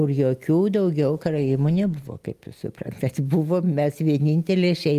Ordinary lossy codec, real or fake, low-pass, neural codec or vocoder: Opus, 32 kbps; real; 14.4 kHz; none